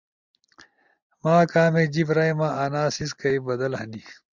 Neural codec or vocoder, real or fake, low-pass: none; real; 7.2 kHz